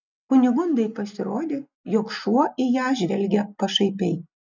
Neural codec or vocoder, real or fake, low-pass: none; real; 7.2 kHz